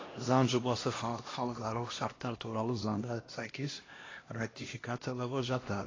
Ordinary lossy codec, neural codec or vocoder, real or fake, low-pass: AAC, 32 kbps; codec, 16 kHz, 1 kbps, X-Codec, HuBERT features, trained on LibriSpeech; fake; 7.2 kHz